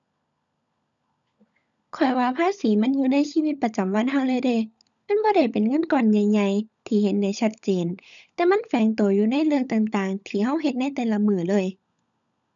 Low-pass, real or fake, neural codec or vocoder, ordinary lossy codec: 7.2 kHz; fake; codec, 16 kHz, 16 kbps, FunCodec, trained on LibriTTS, 50 frames a second; none